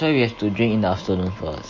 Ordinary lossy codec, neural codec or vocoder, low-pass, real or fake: MP3, 32 kbps; none; 7.2 kHz; real